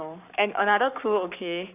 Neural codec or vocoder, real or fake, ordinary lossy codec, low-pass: codec, 44.1 kHz, 7.8 kbps, Pupu-Codec; fake; none; 3.6 kHz